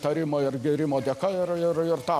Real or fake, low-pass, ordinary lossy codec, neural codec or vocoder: real; 14.4 kHz; MP3, 96 kbps; none